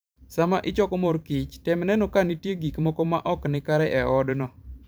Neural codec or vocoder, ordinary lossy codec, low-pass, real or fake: vocoder, 44.1 kHz, 128 mel bands every 512 samples, BigVGAN v2; none; none; fake